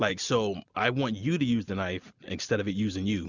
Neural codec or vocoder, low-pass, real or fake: none; 7.2 kHz; real